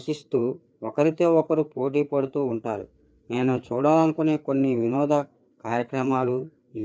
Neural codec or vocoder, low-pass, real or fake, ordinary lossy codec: codec, 16 kHz, 4 kbps, FreqCodec, larger model; none; fake; none